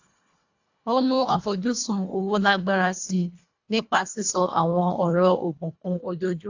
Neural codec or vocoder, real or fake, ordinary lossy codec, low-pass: codec, 24 kHz, 1.5 kbps, HILCodec; fake; AAC, 48 kbps; 7.2 kHz